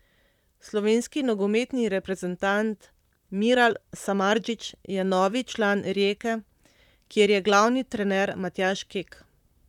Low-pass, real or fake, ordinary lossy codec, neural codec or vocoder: 19.8 kHz; fake; none; vocoder, 44.1 kHz, 128 mel bands, Pupu-Vocoder